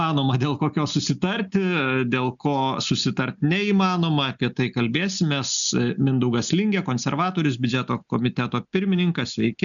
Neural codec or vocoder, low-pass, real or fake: none; 7.2 kHz; real